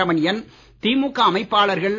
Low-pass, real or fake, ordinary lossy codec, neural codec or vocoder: 7.2 kHz; real; none; none